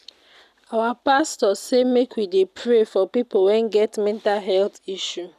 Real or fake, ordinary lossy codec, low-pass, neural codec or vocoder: real; none; 14.4 kHz; none